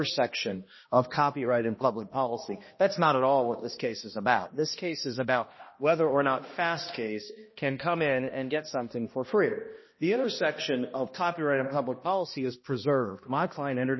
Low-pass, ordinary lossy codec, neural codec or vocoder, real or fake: 7.2 kHz; MP3, 24 kbps; codec, 16 kHz, 1 kbps, X-Codec, HuBERT features, trained on balanced general audio; fake